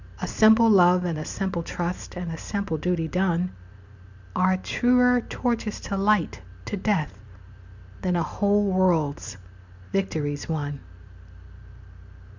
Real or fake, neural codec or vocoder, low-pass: real; none; 7.2 kHz